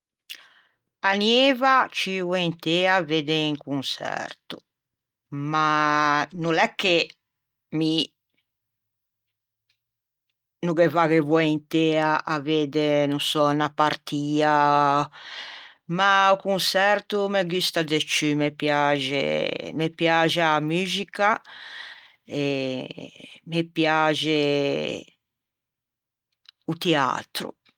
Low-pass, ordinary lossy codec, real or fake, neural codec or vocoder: 19.8 kHz; Opus, 32 kbps; real; none